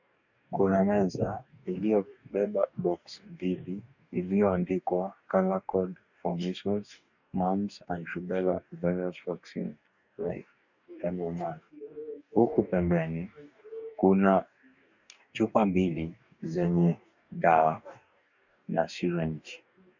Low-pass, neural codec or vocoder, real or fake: 7.2 kHz; codec, 44.1 kHz, 2.6 kbps, DAC; fake